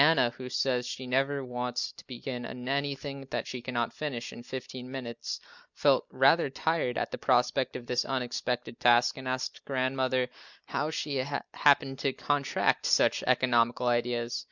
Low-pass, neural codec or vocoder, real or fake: 7.2 kHz; none; real